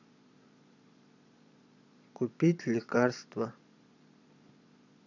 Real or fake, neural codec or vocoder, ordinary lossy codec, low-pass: fake; vocoder, 22.05 kHz, 80 mel bands, Vocos; none; 7.2 kHz